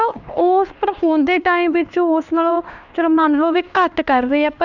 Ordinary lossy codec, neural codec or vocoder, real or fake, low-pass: none; codec, 16 kHz, 2 kbps, X-Codec, HuBERT features, trained on LibriSpeech; fake; 7.2 kHz